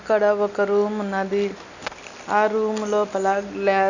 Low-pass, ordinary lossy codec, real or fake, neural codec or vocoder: 7.2 kHz; none; real; none